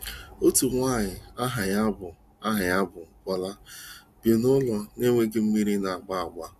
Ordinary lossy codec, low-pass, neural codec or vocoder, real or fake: AAC, 96 kbps; 14.4 kHz; none; real